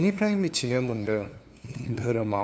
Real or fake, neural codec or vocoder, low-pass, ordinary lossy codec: fake; codec, 16 kHz, 2 kbps, FunCodec, trained on LibriTTS, 25 frames a second; none; none